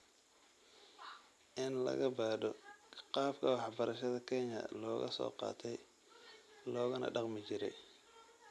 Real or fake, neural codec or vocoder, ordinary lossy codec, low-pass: real; none; none; none